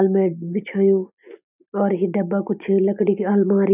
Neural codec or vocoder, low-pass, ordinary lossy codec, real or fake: none; 3.6 kHz; none; real